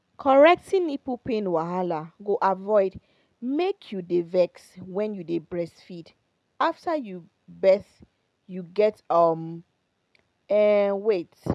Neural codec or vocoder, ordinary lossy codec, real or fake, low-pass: none; none; real; none